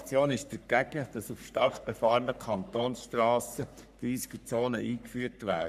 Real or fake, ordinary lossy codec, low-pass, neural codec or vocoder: fake; none; 14.4 kHz; codec, 44.1 kHz, 3.4 kbps, Pupu-Codec